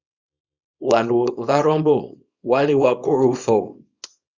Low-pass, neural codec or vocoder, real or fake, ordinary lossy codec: 7.2 kHz; codec, 24 kHz, 0.9 kbps, WavTokenizer, small release; fake; Opus, 64 kbps